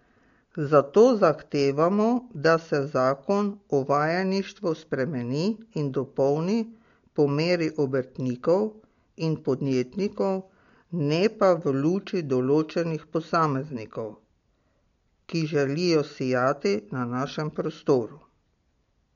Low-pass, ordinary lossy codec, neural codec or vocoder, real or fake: 7.2 kHz; MP3, 48 kbps; none; real